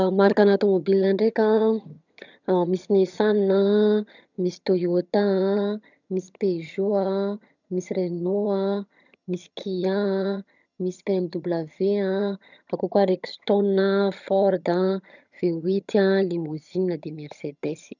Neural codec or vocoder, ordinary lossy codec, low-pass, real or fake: vocoder, 22.05 kHz, 80 mel bands, HiFi-GAN; none; 7.2 kHz; fake